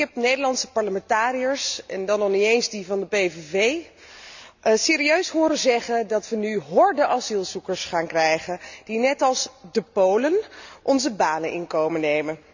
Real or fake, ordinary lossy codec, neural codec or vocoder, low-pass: real; none; none; 7.2 kHz